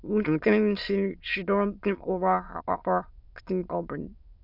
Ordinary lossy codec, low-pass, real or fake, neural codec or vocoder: none; 5.4 kHz; fake; autoencoder, 22.05 kHz, a latent of 192 numbers a frame, VITS, trained on many speakers